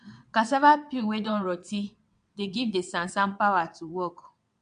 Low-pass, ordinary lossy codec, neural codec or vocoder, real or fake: 9.9 kHz; MP3, 64 kbps; vocoder, 22.05 kHz, 80 mel bands, WaveNeXt; fake